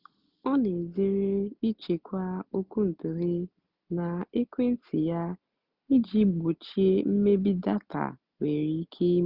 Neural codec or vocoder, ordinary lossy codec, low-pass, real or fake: none; Opus, 64 kbps; 5.4 kHz; real